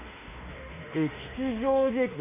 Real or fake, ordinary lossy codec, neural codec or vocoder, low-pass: fake; AAC, 24 kbps; autoencoder, 48 kHz, 32 numbers a frame, DAC-VAE, trained on Japanese speech; 3.6 kHz